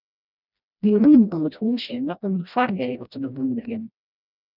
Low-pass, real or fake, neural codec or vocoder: 5.4 kHz; fake; codec, 16 kHz, 1 kbps, FreqCodec, smaller model